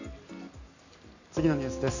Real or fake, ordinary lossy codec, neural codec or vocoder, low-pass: real; none; none; 7.2 kHz